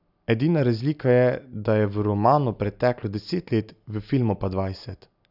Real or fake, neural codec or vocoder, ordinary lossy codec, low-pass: real; none; none; 5.4 kHz